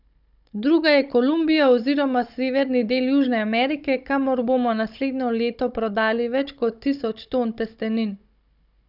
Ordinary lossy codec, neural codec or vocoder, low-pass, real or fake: none; codec, 16 kHz, 16 kbps, FunCodec, trained on Chinese and English, 50 frames a second; 5.4 kHz; fake